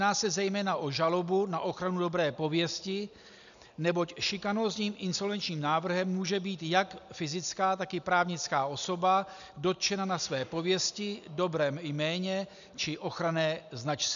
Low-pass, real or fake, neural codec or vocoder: 7.2 kHz; real; none